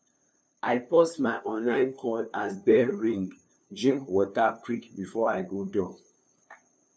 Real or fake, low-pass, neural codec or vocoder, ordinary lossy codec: fake; none; codec, 16 kHz, 2 kbps, FunCodec, trained on LibriTTS, 25 frames a second; none